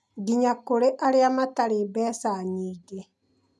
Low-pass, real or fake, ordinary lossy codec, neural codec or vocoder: none; real; none; none